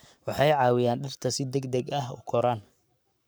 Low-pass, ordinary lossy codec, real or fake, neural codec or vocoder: none; none; fake; codec, 44.1 kHz, 7.8 kbps, Pupu-Codec